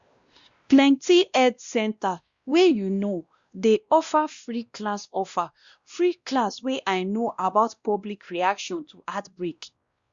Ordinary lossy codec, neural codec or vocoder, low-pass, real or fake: Opus, 64 kbps; codec, 16 kHz, 1 kbps, X-Codec, WavLM features, trained on Multilingual LibriSpeech; 7.2 kHz; fake